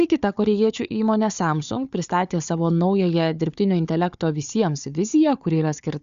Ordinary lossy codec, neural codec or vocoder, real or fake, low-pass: Opus, 64 kbps; codec, 16 kHz, 4 kbps, FunCodec, trained on Chinese and English, 50 frames a second; fake; 7.2 kHz